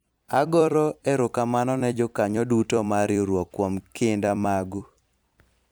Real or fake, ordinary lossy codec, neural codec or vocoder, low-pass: fake; none; vocoder, 44.1 kHz, 128 mel bands every 256 samples, BigVGAN v2; none